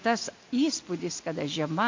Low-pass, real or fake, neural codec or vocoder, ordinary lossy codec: 7.2 kHz; real; none; MP3, 48 kbps